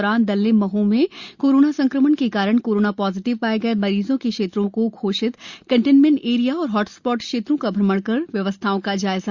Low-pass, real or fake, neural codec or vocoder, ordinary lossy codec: 7.2 kHz; real; none; Opus, 64 kbps